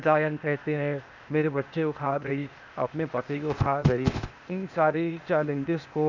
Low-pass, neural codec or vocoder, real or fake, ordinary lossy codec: 7.2 kHz; codec, 16 kHz, 0.8 kbps, ZipCodec; fake; none